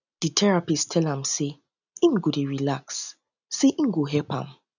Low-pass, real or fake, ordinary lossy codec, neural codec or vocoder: 7.2 kHz; real; none; none